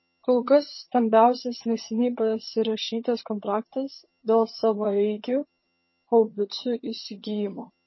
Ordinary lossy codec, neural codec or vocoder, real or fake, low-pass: MP3, 24 kbps; vocoder, 22.05 kHz, 80 mel bands, HiFi-GAN; fake; 7.2 kHz